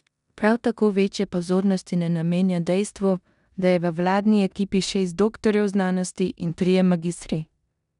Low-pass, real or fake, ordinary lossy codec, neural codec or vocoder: 10.8 kHz; fake; none; codec, 16 kHz in and 24 kHz out, 0.9 kbps, LongCat-Audio-Codec, four codebook decoder